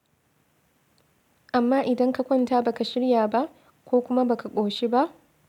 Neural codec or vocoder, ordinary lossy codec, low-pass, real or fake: none; none; 19.8 kHz; real